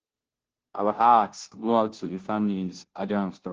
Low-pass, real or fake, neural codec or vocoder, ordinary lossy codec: 7.2 kHz; fake; codec, 16 kHz, 0.5 kbps, FunCodec, trained on Chinese and English, 25 frames a second; Opus, 16 kbps